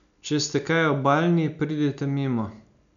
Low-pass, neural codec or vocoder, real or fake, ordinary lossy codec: 7.2 kHz; none; real; none